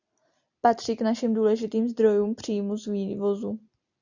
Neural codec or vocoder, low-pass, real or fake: none; 7.2 kHz; real